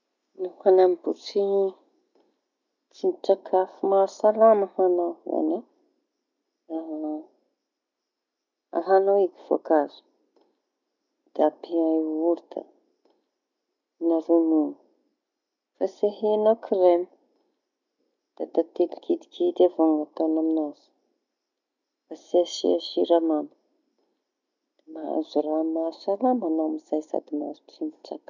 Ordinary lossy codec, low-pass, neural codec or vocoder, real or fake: none; 7.2 kHz; none; real